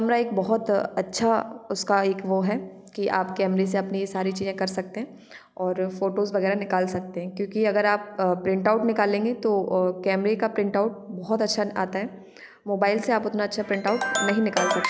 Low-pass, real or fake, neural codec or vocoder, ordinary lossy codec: none; real; none; none